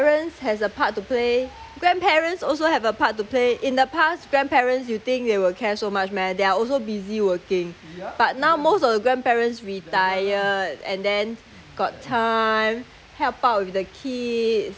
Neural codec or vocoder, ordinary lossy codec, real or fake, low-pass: none; none; real; none